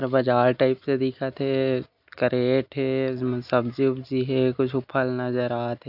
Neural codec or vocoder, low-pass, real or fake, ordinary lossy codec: none; 5.4 kHz; real; AAC, 48 kbps